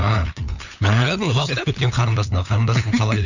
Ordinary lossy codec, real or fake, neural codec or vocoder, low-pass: none; fake; codec, 16 kHz, 8 kbps, FunCodec, trained on LibriTTS, 25 frames a second; 7.2 kHz